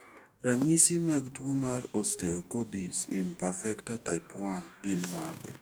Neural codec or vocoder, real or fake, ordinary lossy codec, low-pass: codec, 44.1 kHz, 2.6 kbps, DAC; fake; none; none